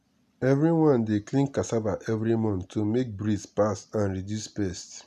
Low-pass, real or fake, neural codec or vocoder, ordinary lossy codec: 14.4 kHz; real; none; none